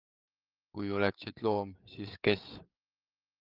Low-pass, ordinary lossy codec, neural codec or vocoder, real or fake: 5.4 kHz; Opus, 32 kbps; codec, 16 kHz, 8 kbps, FreqCodec, larger model; fake